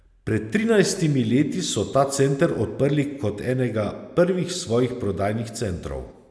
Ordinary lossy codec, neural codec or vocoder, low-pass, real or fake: none; none; none; real